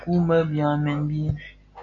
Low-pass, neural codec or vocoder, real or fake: 7.2 kHz; none; real